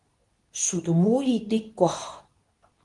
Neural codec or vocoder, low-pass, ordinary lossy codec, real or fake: codec, 24 kHz, 0.9 kbps, WavTokenizer, medium speech release version 2; 10.8 kHz; Opus, 24 kbps; fake